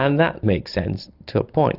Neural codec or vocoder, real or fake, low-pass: none; real; 5.4 kHz